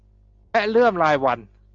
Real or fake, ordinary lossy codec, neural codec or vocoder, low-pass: real; Opus, 64 kbps; none; 7.2 kHz